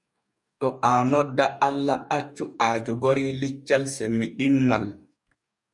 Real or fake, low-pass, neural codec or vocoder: fake; 10.8 kHz; codec, 44.1 kHz, 2.6 kbps, DAC